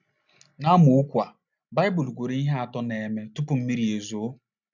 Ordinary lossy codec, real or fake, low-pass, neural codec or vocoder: none; real; 7.2 kHz; none